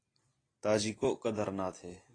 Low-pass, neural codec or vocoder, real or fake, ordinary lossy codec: 9.9 kHz; none; real; AAC, 32 kbps